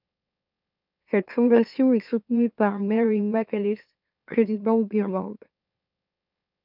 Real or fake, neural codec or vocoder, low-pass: fake; autoencoder, 44.1 kHz, a latent of 192 numbers a frame, MeloTTS; 5.4 kHz